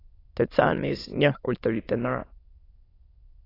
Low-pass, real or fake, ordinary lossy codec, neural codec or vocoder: 5.4 kHz; fake; AAC, 24 kbps; autoencoder, 22.05 kHz, a latent of 192 numbers a frame, VITS, trained on many speakers